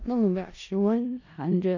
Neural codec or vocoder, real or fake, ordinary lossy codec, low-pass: codec, 16 kHz in and 24 kHz out, 0.4 kbps, LongCat-Audio-Codec, four codebook decoder; fake; none; 7.2 kHz